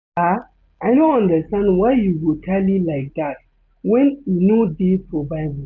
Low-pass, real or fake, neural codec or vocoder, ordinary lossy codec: 7.2 kHz; real; none; none